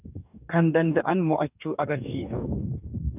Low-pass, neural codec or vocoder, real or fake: 3.6 kHz; codec, 44.1 kHz, 2.6 kbps, DAC; fake